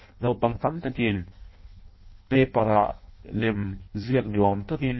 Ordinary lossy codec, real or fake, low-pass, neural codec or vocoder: MP3, 24 kbps; fake; 7.2 kHz; codec, 16 kHz in and 24 kHz out, 0.6 kbps, FireRedTTS-2 codec